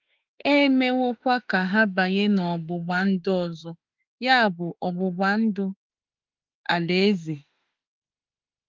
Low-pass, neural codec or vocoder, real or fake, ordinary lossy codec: 7.2 kHz; autoencoder, 48 kHz, 32 numbers a frame, DAC-VAE, trained on Japanese speech; fake; Opus, 32 kbps